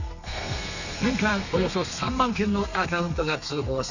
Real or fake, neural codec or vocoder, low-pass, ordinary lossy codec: fake; codec, 32 kHz, 1.9 kbps, SNAC; 7.2 kHz; none